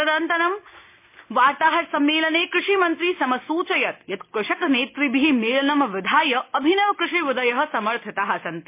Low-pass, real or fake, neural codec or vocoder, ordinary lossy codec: 3.6 kHz; real; none; MP3, 24 kbps